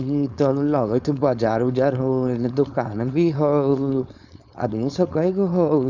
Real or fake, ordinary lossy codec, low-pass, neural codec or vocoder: fake; none; 7.2 kHz; codec, 16 kHz, 4.8 kbps, FACodec